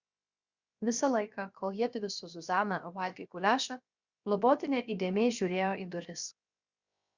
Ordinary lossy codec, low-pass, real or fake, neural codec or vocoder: Opus, 64 kbps; 7.2 kHz; fake; codec, 16 kHz, 0.7 kbps, FocalCodec